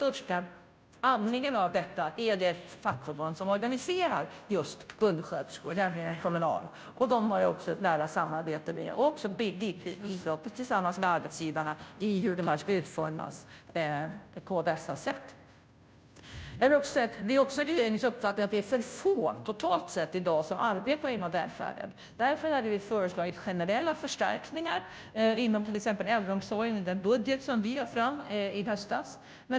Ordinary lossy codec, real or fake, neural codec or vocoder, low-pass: none; fake; codec, 16 kHz, 0.5 kbps, FunCodec, trained on Chinese and English, 25 frames a second; none